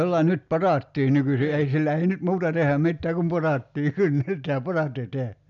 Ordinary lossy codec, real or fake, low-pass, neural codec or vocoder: none; real; 7.2 kHz; none